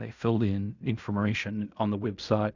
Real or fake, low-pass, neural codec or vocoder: fake; 7.2 kHz; codec, 16 kHz in and 24 kHz out, 0.4 kbps, LongCat-Audio-Codec, fine tuned four codebook decoder